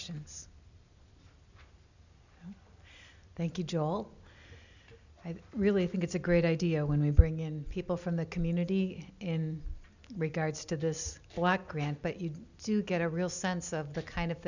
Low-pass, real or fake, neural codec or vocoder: 7.2 kHz; real; none